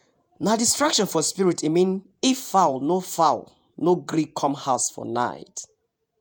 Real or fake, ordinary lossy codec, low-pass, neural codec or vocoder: fake; none; none; vocoder, 48 kHz, 128 mel bands, Vocos